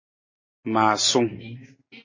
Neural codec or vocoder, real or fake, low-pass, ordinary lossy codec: none; real; 7.2 kHz; MP3, 32 kbps